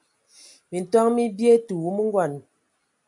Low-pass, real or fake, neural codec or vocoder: 10.8 kHz; real; none